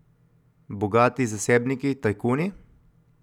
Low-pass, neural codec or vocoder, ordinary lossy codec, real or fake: 19.8 kHz; none; none; real